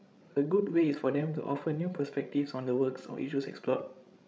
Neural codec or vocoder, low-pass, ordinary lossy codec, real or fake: codec, 16 kHz, 16 kbps, FreqCodec, larger model; none; none; fake